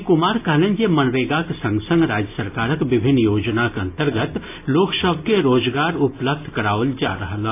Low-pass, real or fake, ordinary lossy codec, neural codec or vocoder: 3.6 kHz; real; AAC, 24 kbps; none